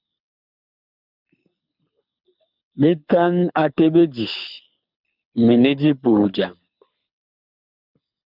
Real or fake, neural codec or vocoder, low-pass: fake; codec, 24 kHz, 6 kbps, HILCodec; 5.4 kHz